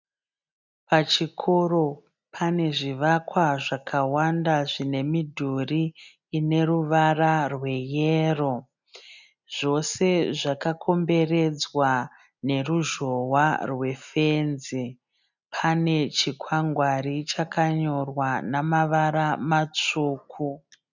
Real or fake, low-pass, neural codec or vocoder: real; 7.2 kHz; none